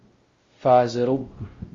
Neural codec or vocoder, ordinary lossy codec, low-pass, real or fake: codec, 16 kHz, 0.5 kbps, X-Codec, WavLM features, trained on Multilingual LibriSpeech; Opus, 32 kbps; 7.2 kHz; fake